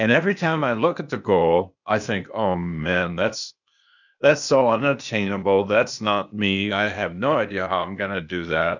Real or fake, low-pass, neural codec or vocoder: fake; 7.2 kHz; codec, 16 kHz, 0.8 kbps, ZipCodec